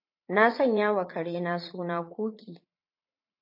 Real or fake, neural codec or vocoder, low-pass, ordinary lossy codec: fake; vocoder, 44.1 kHz, 80 mel bands, Vocos; 5.4 kHz; MP3, 32 kbps